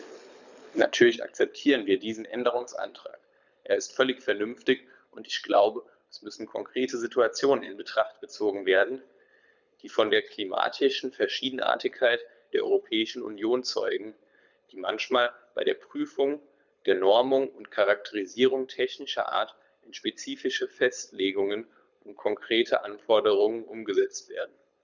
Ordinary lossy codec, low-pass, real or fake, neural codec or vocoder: none; 7.2 kHz; fake; codec, 24 kHz, 6 kbps, HILCodec